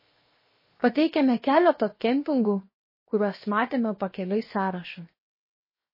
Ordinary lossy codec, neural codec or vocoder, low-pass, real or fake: MP3, 24 kbps; codec, 16 kHz, 0.7 kbps, FocalCodec; 5.4 kHz; fake